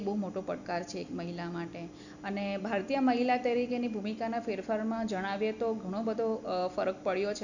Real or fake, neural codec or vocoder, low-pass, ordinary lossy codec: real; none; 7.2 kHz; none